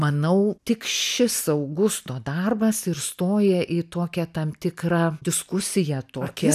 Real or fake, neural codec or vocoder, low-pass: real; none; 14.4 kHz